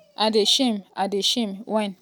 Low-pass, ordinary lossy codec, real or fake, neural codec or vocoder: none; none; real; none